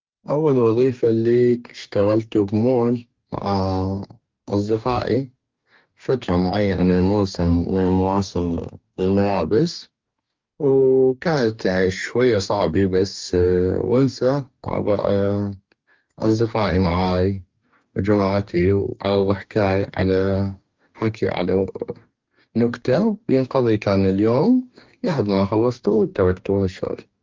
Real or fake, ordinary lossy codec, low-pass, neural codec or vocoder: fake; Opus, 32 kbps; 7.2 kHz; codec, 44.1 kHz, 2.6 kbps, DAC